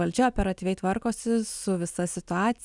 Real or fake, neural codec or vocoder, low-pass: real; none; 10.8 kHz